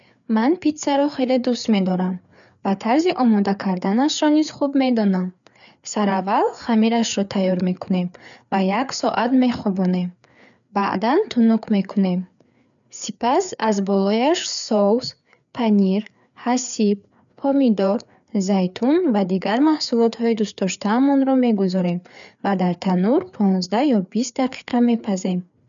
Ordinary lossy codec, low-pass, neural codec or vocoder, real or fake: none; 7.2 kHz; codec, 16 kHz, 4 kbps, FreqCodec, larger model; fake